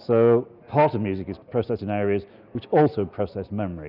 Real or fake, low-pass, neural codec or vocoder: real; 5.4 kHz; none